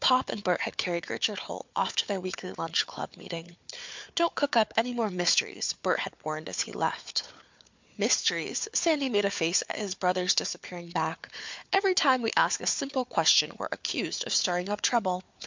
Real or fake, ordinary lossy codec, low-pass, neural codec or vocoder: fake; MP3, 64 kbps; 7.2 kHz; codec, 16 kHz, 4 kbps, FunCodec, trained on Chinese and English, 50 frames a second